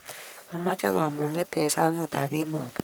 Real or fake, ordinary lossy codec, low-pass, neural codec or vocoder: fake; none; none; codec, 44.1 kHz, 1.7 kbps, Pupu-Codec